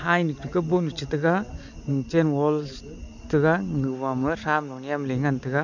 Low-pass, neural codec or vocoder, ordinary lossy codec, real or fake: 7.2 kHz; none; none; real